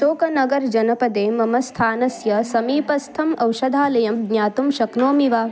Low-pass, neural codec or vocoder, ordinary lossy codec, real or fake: none; none; none; real